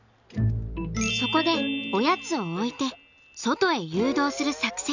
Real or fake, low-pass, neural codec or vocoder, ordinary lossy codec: real; 7.2 kHz; none; none